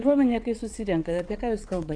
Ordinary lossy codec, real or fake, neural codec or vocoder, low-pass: AAC, 64 kbps; fake; vocoder, 22.05 kHz, 80 mel bands, WaveNeXt; 9.9 kHz